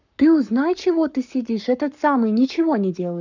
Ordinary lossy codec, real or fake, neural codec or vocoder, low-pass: none; fake; codec, 44.1 kHz, 7.8 kbps, Pupu-Codec; 7.2 kHz